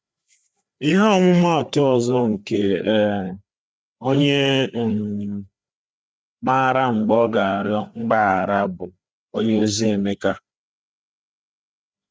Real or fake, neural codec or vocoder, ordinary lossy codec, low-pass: fake; codec, 16 kHz, 4 kbps, FreqCodec, larger model; none; none